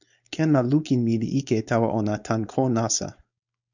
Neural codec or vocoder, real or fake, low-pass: codec, 16 kHz, 4.8 kbps, FACodec; fake; 7.2 kHz